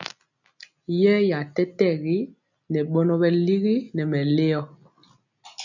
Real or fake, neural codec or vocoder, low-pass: real; none; 7.2 kHz